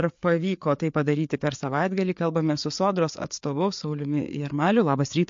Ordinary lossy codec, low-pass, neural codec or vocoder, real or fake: MP3, 48 kbps; 7.2 kHz; codec, 16 kHz, 4 kbps, FreqCodec, larger model; fake